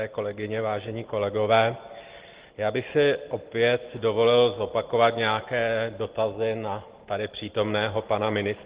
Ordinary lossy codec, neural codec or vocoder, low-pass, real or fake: Opus, 16 kbps; none; 3.6 kHz; real